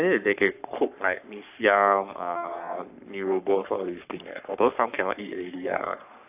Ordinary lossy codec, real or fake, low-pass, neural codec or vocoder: AAC, 32 kbps; fake; 3.6 kHz; codec, 44.1 kHz, 3.4 kbps, Pupu-Codec